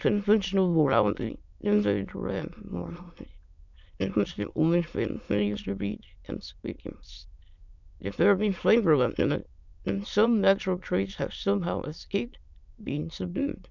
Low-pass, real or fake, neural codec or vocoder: 7.2 kHz; fake; autoencoder, 22.05 kHz, a latent of 192 numbers a frame, VITS, trained on many speakers